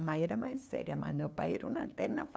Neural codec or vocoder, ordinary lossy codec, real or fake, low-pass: codec, 16 kHz, 2 kbps, FunCodec, trained on LibriTTS, 25 frames a second; none; fake; none